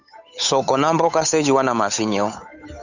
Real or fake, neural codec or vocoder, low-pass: fake; codec, 16 kHz, 8 kbps, FunCodec, trained on Chinese and English, 25 frames a second; 7.2 kHz